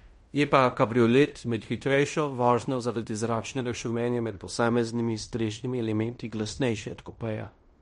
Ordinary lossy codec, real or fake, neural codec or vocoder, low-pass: MP3, 48 kbps; fake; codec, 16 kHz in and 24 kHz out, 0.9 kbps, LongCat-Audio-Codec, fine tuned four codebook decoder; 10.8 kHz